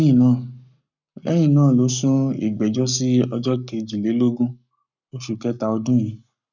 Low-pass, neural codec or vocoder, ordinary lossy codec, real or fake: 7.2 kHz; codec, 44.1 kHz, 7.8 kbps, Pupu-Codec; none; fake